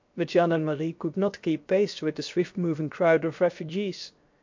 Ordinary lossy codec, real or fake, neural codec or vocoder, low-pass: MP3, 48 kbps; fake; codec, 16 kHz, 0.7 kbps, FocalCodec; 7.2 kHz